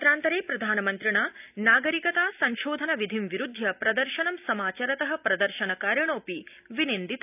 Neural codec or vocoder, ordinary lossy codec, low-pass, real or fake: none; none; 3.6 kHz; real